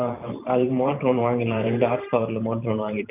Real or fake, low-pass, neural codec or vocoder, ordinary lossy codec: fake; 3.6 kHz; vocoder, 44.1 kHz, 128 mel bands every 512 samples, BigVGAN v2; none